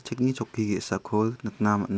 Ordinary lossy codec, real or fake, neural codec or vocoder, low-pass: none; real; none; none